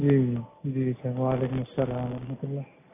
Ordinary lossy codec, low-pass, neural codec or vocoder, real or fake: AAC, 16 kbps; 3.6 kHz; none; real